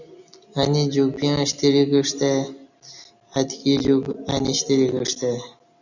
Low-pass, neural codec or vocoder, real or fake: 7.2 kHz; none; real